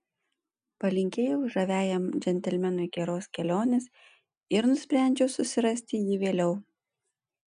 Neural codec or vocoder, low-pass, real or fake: none; 9.9 kHz; real